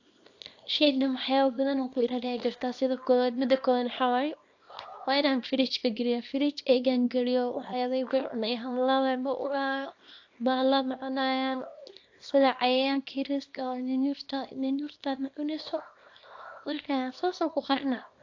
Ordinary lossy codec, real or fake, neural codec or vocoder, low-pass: AAC, 48 kbps; fake; codec, 24 kHz, 0.9 kbps, WavTokenizer, small release; 7.2 kHz